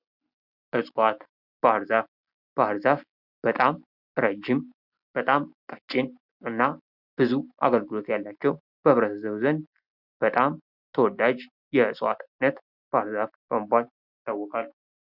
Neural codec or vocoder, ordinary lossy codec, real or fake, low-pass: none; Opus, 64 kbps; real; 5.4 kHz